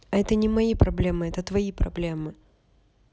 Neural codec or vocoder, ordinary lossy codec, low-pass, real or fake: none; none; none; real